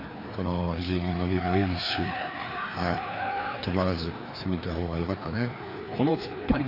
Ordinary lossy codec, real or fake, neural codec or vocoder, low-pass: AAC, 32 kbps; fake; codec, 16 kHz, 2 kbps, FreqCodec, larger model; 5.4 kHz